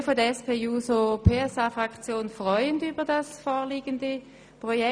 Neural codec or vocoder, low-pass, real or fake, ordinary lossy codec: none; 9.9 kHz; real; none